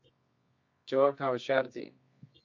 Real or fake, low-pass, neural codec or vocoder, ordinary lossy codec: fake; 7.2 kHz; codec, 24 kHz, 0.9 kbps, WavTokenizer, medium music audio release; MP3, 48 kbps